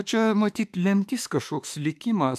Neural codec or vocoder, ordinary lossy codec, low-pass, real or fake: autoencoder, 48 kHz, 32 numbers a frame, DAC-VAE, trained on Japanese speech; AAC, 96 kbps; 14.4 kHz; fake